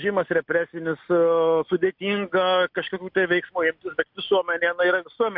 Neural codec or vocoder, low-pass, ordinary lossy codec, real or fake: none; 5.4 kHz; MP3, 48 kbps; real